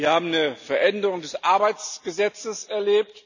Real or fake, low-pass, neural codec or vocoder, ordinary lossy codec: real; 7.2 kHz; none; none